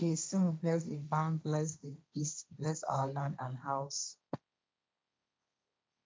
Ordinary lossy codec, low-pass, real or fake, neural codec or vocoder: none; none; fake; codec, 16 kHz, 1.1 kbps, Voila-Tokenizer